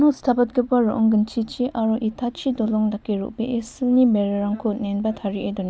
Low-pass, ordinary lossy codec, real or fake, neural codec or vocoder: none; none; real; none